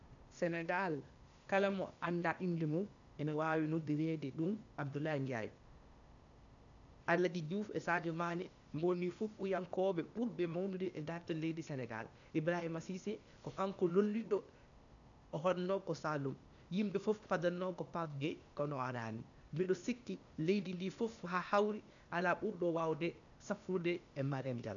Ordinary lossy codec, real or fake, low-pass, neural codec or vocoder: none; fake; 7.2 kHz; codec, 16 kHz, 0.8 kbps, ZipCodec